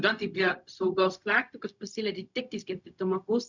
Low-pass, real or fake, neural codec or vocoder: 7.2 kHz; fake; codec, 16 kHz, 0.4 kbps, LongCat-Audio-Codec